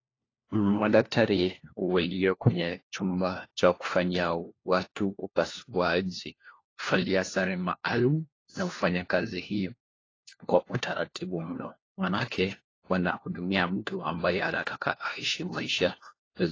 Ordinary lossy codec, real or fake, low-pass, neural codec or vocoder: AAC, 32 kbps; fake; 7.2 kHz; codec, 16 kHz, 1 kbps, FunCodec, trained on LibriTTS, 50 frames a second